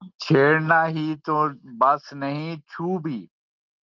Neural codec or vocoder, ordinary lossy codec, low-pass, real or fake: none; Opus, 24 kbps; 7.2 kHz; real